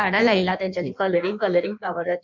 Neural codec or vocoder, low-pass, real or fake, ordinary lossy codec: codec, 16 kHz in and 24 kHz out, 1.1 kbps, FireRedTTS-2 codec; 7.2 kHz; fake; none